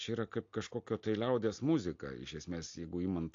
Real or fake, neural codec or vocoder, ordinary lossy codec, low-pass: real; none; AAC, 48 kbps; 7.2 kHz